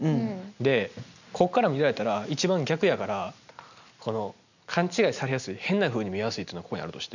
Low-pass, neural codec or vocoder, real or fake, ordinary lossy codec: 7.2 kHz; none; real; none